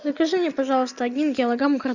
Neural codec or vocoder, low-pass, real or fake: none; 7.2 kHz; real